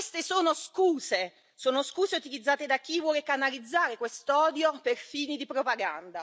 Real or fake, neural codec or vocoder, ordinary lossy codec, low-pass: real; none; none; none